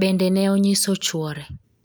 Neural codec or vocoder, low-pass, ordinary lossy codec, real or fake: none; none; none; real